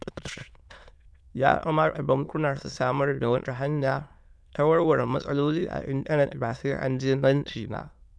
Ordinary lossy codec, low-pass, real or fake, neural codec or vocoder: none; none; fake; autoencoder, 22.05 kHz, a latent of 192 numbers a frame, VITS, trained on many speakers